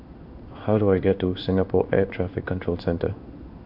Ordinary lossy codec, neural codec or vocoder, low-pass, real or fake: none; codec, 16 kHz in and 24 kHz out, 1 kbps, XY-Tokenizer; 5.4 kHz; fake